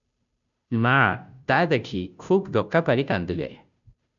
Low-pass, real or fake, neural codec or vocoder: 7.2 kHz; fake; codec, 16 kHz, 0.5 kbps, FunCodec, trained on Chinese and English, 25 frames a second